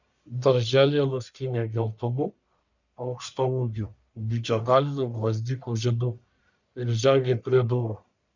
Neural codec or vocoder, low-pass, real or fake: codec, 44.1 kHz, 1.7 kbps, Pupu-Codec; 7.2 kHz; fake